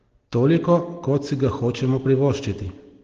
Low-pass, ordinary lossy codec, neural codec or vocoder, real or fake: 7.2 kHz; Opus, 16 kbps; none; real